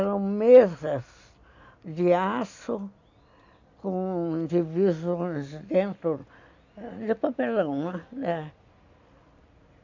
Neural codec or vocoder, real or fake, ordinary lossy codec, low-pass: none; real; AAC, 48 kbps; 7.2 kHz